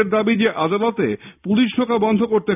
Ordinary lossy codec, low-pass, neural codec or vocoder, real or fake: none; 3.6 kHz; none; real